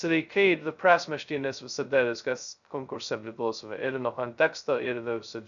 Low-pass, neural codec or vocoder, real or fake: 7.2 kHz; codec, 16 kHz, 0.2 kbps, FocalCodec; fake